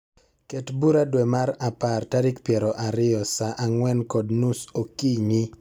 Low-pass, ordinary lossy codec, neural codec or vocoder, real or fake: none; none; none; real